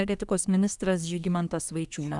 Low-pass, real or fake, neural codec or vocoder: 10.8 kHz; fake; codec, 24 kHz, 1 kbps, SNAC